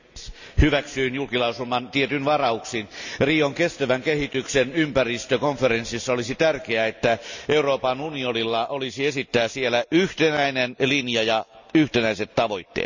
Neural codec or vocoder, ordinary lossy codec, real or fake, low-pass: none; none; real; 7.2 kHz